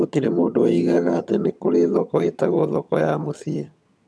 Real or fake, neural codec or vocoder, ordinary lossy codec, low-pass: fake; vocoder, 22.05 kHz, 80 mel bands, HiFi-GAN; none; none